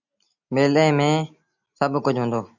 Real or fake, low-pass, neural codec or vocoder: real; 7.2 kHz; none